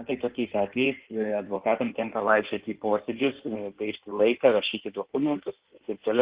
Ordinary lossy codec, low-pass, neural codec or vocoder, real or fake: Opus, 16 kbps; 3.6 kHz; codec, 16 kHz in and 24 kHz out, 1.1 kbps, FireRedTTS-2 codec; fake